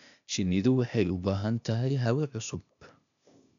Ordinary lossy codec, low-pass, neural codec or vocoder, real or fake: MP3, 96 kbps; 7.2 kHz; codec, 16 kHz, 0.8 kbps, ZipCodec; fake